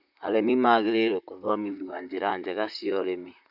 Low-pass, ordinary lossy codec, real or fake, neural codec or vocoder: 5.4 kHz; none; fake; vocoder, 44.1 kHz, 128 mel bands, Pupu-Vocoder